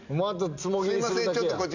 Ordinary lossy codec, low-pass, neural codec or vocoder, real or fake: none; 7.2 kHz; none; real